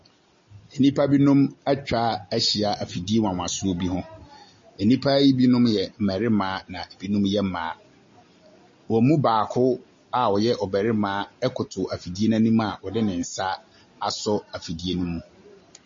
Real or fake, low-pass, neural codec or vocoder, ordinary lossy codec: real; 7.2 kHz; none; MP3, 32 kbps